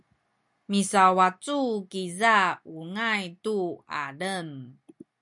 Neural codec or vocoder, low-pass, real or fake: none; 10.8 kHz; real